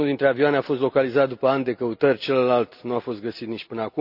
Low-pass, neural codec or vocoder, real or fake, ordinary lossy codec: 5.4 kHz; none; real; none